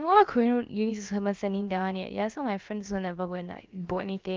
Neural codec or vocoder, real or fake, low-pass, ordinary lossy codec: codec, 16 kHz, about 1 kbps, DyCAST, with the encoder's durations; fake; 7.2 kHz; Opus, 32 kbps